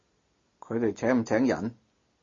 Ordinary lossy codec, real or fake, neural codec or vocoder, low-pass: MP3, 32 kbps; real; none; 7.2 kHz